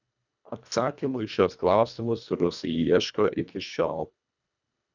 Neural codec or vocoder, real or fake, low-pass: codec, 24 kHz, 1.5 kbps, HILCodec; fake; 7.2 kHz